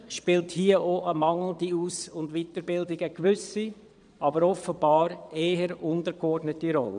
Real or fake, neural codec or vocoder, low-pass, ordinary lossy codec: fake; vocoder, 22.05 kHz, 80 mel bands, Vocos; 9.9 kHz; none